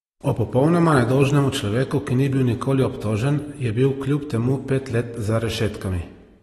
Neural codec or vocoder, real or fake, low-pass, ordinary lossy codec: none; real; 19.8 kHz; AAC, 32 kbps